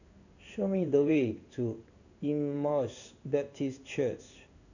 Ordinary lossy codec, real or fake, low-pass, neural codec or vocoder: none; fake; 7.2 kHz; codec, 16 kHz in and 24 kHz out, 1 kbps, XY-Tokenizer